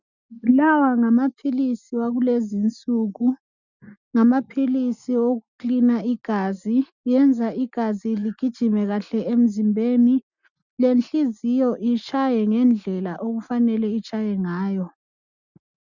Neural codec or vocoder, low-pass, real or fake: none; 7.2 kHz; real